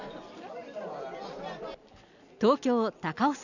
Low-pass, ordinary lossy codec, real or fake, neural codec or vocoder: 7.2 kHz; none; real; none